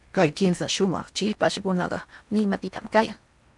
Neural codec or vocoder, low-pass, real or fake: codec, 16 kHz in and 24 kHz out, 0.8 kbps, FocalCodec, streaming, 65536 codes; 10.8 kHz; fake